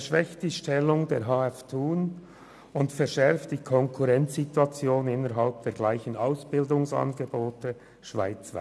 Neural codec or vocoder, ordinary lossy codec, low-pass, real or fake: none; none; none; real